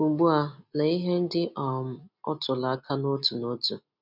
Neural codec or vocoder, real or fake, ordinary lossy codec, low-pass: none; real; none; 5.4 kHz